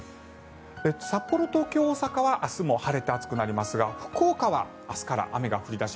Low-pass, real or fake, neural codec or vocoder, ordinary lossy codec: none; real; none; none